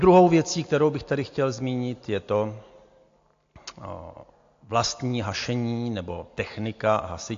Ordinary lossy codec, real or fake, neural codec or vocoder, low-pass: AAC, 48 kbps; real; none; 7.2 kHz